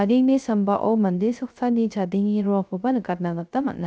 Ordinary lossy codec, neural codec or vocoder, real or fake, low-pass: none; codec, 16 kHz, 0.3 kbps, FocalCodec; fake; none